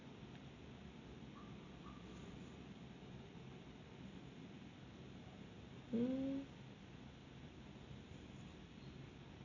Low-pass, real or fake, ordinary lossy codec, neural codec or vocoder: 7.2 kHz; real; AAC, 48 kbps; none